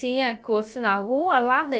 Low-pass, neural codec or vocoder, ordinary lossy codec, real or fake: none; codec, 16 kHz, about 1 kbps, DyCAST, with the encoder's durations; none; fake